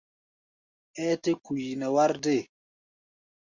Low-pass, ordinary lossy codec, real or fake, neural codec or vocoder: 7.2 kHz; Opus, 64 kbps; real; none